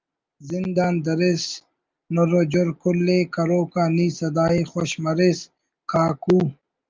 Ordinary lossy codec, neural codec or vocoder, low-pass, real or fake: Opus, 24 kbps; none; 7.2 kHz; real